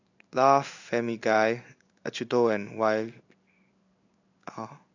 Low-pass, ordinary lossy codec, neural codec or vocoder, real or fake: 7.2 kHz; none; codec, 16 kHz in and 24 kHz out, 1 kbps, XY-Tokenizer; fake